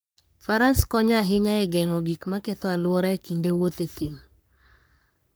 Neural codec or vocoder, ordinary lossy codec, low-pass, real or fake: codec, 44.1 kHz, 3.4 kbps, Pupu-Codec; none; none; fake